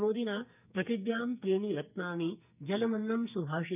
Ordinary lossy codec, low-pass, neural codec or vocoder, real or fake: none; 3.6 kHz; codec, 44.1 kHz, 2.6 kbps, SNAC; fake